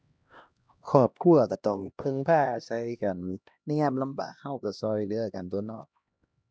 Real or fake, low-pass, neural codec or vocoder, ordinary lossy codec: fake; none; codec, 16 kHz, 1 kbps, X-Codec, HuBERT features, trained on LibriSpeech; none